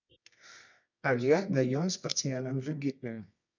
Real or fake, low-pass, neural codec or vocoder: fake; 7.2 kHz; codec, 24 kHz, 0.9 kbps, WavTokenizer, medium music audio release